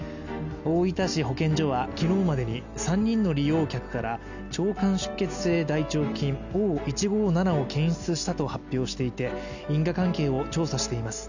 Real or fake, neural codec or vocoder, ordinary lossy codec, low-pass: real; none; none; 7.2 kHz